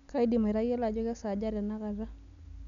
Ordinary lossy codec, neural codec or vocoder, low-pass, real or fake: none; none; 7.2 kHz; real